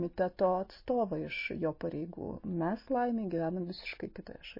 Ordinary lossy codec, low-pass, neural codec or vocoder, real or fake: MP3, 24 kbps; 5.4 kHz; none; real